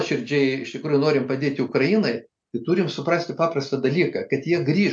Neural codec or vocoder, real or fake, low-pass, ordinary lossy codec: none; real; 9.9 kHz; MP3, 64 kbps